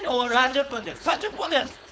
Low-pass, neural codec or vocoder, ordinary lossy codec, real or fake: none; codec, 16 kHz, 4.8 kbps, FACodec; none; fake